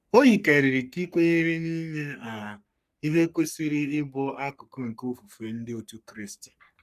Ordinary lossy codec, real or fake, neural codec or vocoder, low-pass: none; fake; codec, 44.1 kHz, 3.4 kbps, Pupu-Codec; 14.4 kHz